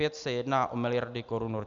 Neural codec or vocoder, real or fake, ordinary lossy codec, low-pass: none; real; Opus, 64 kbps; 7.2 kHz